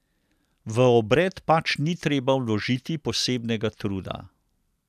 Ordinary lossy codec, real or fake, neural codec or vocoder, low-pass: none; real; none; 14.4 kHz